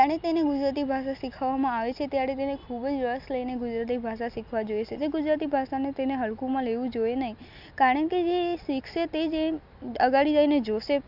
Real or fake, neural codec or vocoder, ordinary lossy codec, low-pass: real; none; none; 5.4 kHz